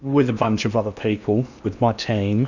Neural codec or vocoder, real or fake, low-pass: codec, 16 kHz in and 24 kHz out, 0.8 kbps, FocalCodec, streaming, 65536 codes; fake; 7.2 kHz